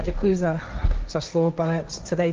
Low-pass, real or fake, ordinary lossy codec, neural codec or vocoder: 7.2 kHz; fake; Opus, 24 kbps; codec, 16 kHz, 1.1 kbps, Voila-Tokenizer